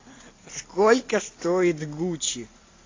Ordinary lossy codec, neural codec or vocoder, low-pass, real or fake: AAC, 32 kbps; none; 7.2 kHz; real